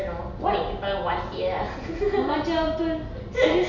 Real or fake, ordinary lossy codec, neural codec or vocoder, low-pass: real; none; none; 7.2 kHz